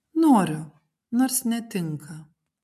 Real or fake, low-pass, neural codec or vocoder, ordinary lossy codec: real; 14.4 kHz; none; MP3, 96 kbps